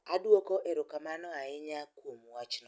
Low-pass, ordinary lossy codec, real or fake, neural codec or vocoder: none; none; real; none